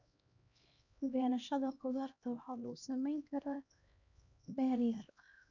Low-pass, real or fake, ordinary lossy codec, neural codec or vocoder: 7.2 kHz; fake; none; codec, 16 kHz, 1 kbps, X-Codec, HuBERT features, trained on LibriSpeech